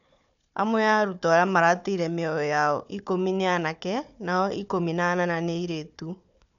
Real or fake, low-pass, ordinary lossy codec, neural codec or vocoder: fake; 7.2 kHz; none; codec, 16 kHz, 4 kbps, FunCodec, trained on Chinese and English, 50 frames a second